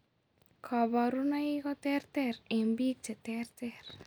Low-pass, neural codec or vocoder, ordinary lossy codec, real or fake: none; none; none; real